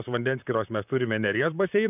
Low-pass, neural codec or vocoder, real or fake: 3.6 kHz; vocoder, 44.1 kHz, 128 mel bands, Pupu-Vocoder; fake